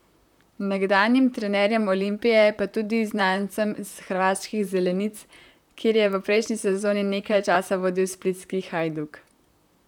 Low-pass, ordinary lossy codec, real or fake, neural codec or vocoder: 19.8 kHz; none; fake; vocoder, 44.1 kHz, 128 mel bands, Pupu-Vocoder